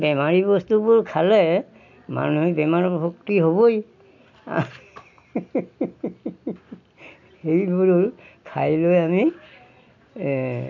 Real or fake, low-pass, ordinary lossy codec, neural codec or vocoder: real; 7.2 kHz; none; none